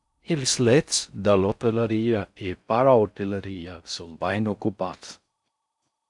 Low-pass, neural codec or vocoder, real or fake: 10.8 kHz; codec, 16 kHz in and 24 kHz out, 0.6 kbps, FocalCodec, streaming, 4096 codes; fake